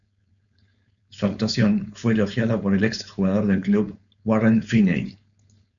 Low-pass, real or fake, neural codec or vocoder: 7.2 kHz; fake; codec, 16 kHz, 4.8 kbps, FACodec